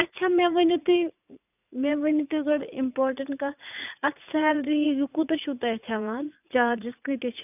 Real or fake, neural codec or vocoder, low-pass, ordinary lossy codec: fake; vocoder, 44.1 kHz, 80 mel bands, Vocos; 3.6 kHz; none